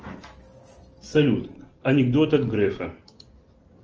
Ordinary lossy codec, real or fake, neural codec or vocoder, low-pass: Opus, 24 kbps; real; none; 7.2 kHz